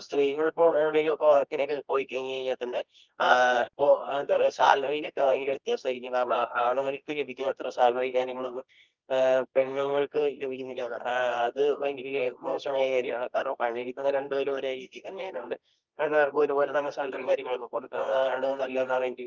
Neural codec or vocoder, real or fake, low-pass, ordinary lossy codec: codec, 24 kHz, 0.9 kbps, WavTokenizer, medium music audio release; fake; 7.2 kHz; Opus, 24 kbps